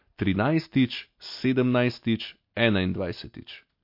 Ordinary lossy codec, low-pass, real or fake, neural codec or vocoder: MP3, 32 kbps; 5.4 kHz; real; none